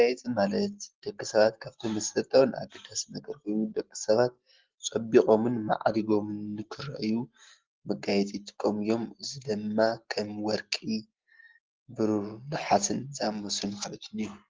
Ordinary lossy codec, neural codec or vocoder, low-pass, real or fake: Opus, 16 kbps; none; 7.2 kHz; real